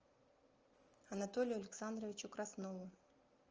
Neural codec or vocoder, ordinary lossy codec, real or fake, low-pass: none; Opus, 24 kbps; real; 7.2 kHz